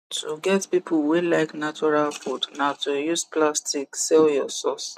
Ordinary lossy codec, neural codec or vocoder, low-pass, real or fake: none; none; 14.4 kHz; real